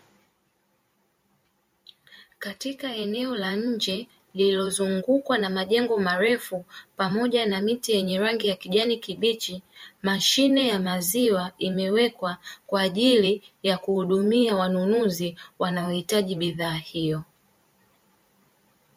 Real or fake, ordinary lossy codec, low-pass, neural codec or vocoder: fake; MP3, 64 kbps; 19.8 kHz; vocoder, 44.1 kHz, 128 mel bands every 512 samples, BigVGAN v2